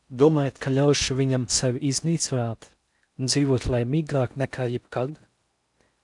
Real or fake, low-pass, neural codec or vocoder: fake; 10.8 kHz; codec, 16 kHz in and 24 kHz out, 0.6 kbps, FocalCodec, streaming, 4096 codes